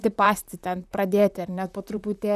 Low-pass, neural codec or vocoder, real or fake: 14.4 kHz; vocoder, 44.1 kHz, 128 mel bands, Pupu-Vocoder; fake